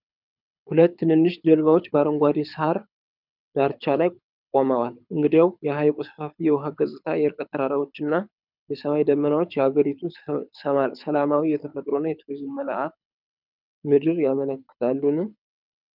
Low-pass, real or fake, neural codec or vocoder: 5.4 kHz; fake; codec, 24 kHz, 6 kbps, HILCodec